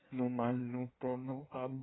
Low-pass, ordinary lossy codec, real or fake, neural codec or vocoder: 7.2 kHz; AAC, 16 kbps; fake; codec, 16 kHz in and 24 kHz out, 1.1 kbps, FireRedTTS-2 codec